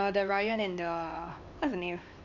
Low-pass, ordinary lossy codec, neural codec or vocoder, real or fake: 7.2 kHz; none; codec, 16 kHz, 4 kbps, X-Codec, HuBERT features, trained on LibriSpeech; fake